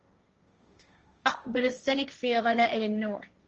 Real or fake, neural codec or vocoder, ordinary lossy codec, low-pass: fake; codec, 16 kHz, 1.1 kbps, Voila-Tokenizer; Opus, 24 kbps; 7.2 kHz